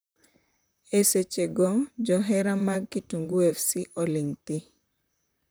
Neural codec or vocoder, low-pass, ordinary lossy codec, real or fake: vocoder, 44.1 kHz, 128 mel bands, Pupu-Vocoder; none; none; fake